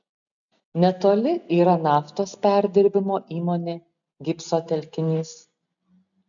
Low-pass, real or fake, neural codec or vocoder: 7.2 kHz; real; none